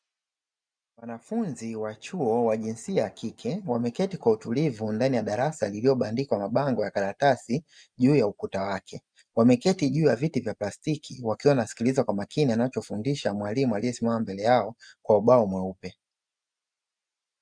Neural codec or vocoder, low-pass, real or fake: none; 9.9 kHz; real